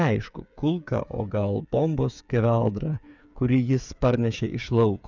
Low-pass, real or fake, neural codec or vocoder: 7.2 kHz; fake; codec, 16 kHz, 8 kbps, FreqCodec, smaller model